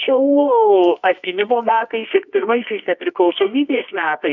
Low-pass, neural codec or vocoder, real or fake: 7.2 kHz; codec, 24 kHz, 0.9 kbps, WavTokenizer, medium music audio release; fake